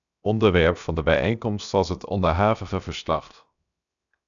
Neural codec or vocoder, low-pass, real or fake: codec, 16 kHz, 0.7 kbps, FocalCodec; 7.2 kHz; fake